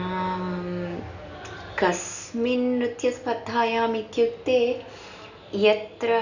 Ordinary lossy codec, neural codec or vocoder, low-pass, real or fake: none; none; 7.2 kHz; real